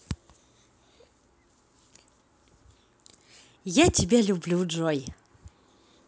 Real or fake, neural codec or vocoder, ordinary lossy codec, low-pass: real; none; none; none